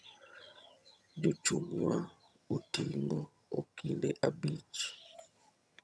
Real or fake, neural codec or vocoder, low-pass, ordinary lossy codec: fake; vocoder, 22.05 kHz, 80 mel bands, HiFi-GAN; none; none